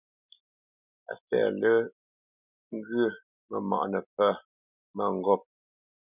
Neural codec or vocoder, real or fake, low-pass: none; real; 3.6 kHz